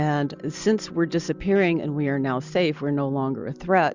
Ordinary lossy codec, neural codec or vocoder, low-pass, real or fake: Opus, 64 kbps; none; 7.2 kHz; real